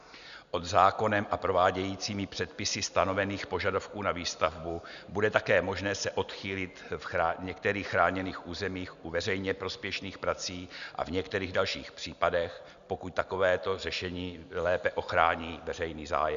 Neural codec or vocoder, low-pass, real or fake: none; 7.2 kHz; real